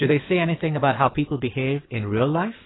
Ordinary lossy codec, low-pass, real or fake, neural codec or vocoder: AAC, 16 kbps; 7.2 kHz; fake; codec, 16 kHz in and 24 kHz out, 2.2 kbps, FireRedTTS-2 codec